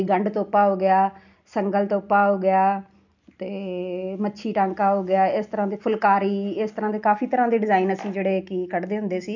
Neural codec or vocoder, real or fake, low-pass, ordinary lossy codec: none; real; 7.2 kHz; none